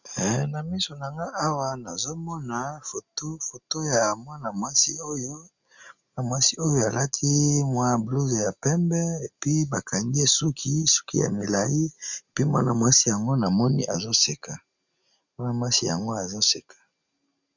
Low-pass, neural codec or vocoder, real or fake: 7.2 kHz; none; real